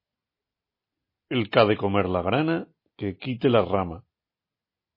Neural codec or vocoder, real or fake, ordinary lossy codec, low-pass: none; real; MP3, 24 kbps; 5.4 kHz